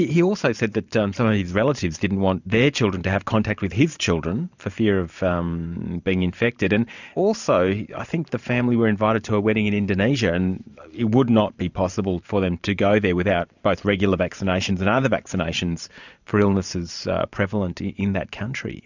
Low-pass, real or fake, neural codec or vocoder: 7.2 kHz; fake; vocoder, 44.1 kHz, 128 mel bands every 512 samples, BigVGAN v2